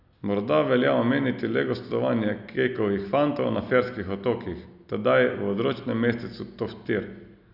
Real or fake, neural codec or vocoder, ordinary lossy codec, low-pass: real; none; none; 5.4 kHz